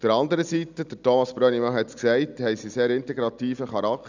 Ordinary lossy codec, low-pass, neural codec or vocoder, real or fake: none; 7.2 kHz; none; real